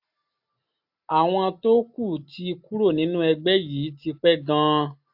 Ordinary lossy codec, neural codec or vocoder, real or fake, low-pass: AAC, 48 kbps; none; real; 5.4 kHz